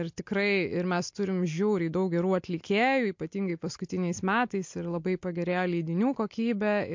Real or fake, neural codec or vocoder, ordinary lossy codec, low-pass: real; none; MP3, 48 kbps; 7.2 kHz